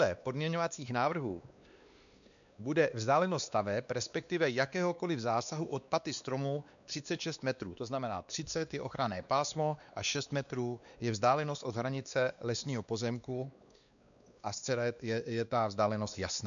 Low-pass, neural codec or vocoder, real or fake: 7.2 kHz; codec, 16 kHz, 2 kbps, X-Codec, WavLM features, trained on Multilingual LibriSpeech; fake